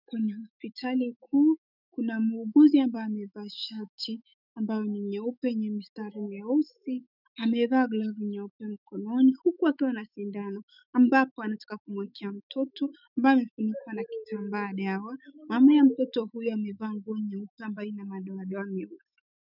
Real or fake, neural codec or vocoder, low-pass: fake; autoencoder, 48 kHz, 128 numbers a frame, DAC-VAE, trained on Japanese speech; 5.4 kHz